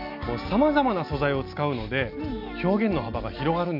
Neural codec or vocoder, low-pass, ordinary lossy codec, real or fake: none; 5.4 kHz; none; real